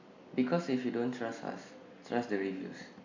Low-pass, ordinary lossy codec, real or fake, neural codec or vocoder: 7.2 kHz; AAC, 48 kbps; real; none